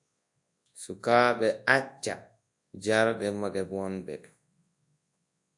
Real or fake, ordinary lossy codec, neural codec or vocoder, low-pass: fake; AAC, 64 kbps; codec, 24 kHz, 0.9 kbps, WavTokenizer, large speech release; 10.8 kHz